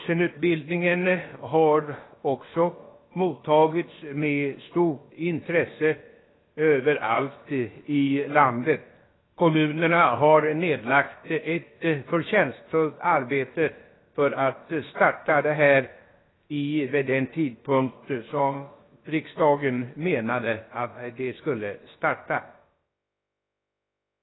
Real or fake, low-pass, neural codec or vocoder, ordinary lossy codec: fake; 7.2 kHz; codec, 16 kHz, about 1 kbps, DyCAST, with the encoder's durations; AAC, 16 kbps